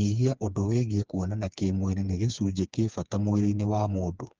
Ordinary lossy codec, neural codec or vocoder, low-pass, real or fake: Opus, 24 kbps; codec, 16 kHz, 4 kbps, FreqCodec, smaller model; 7.2 kHz; fake